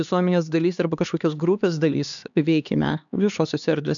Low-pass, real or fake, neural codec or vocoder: 7.2 kHz; fake; codec, 16 kHz, 2 kbps, X-Codec, HuBERT features, trained on LibriSpeech